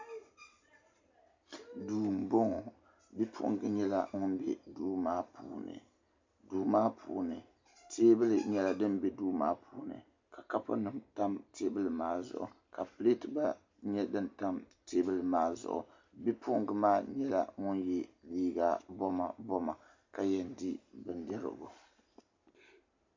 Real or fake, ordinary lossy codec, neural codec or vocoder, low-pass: real; MP3, 64 kbps; none; 7.2 kHz